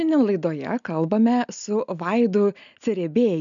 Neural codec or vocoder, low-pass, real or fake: none; 7.2 kHz; real